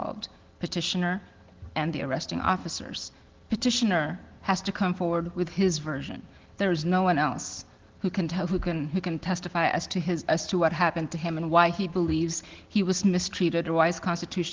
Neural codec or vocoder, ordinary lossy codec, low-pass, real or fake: none; Opus, 16 kbps; 7.2 kHz; real